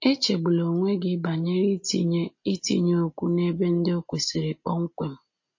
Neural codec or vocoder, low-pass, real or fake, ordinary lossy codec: none; 7.2 kHz; real; MP3, 32 kbps